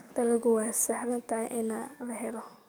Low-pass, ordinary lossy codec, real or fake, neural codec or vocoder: none; none; fake; codec, 44.1 kHz, 7.8 kbps, DAC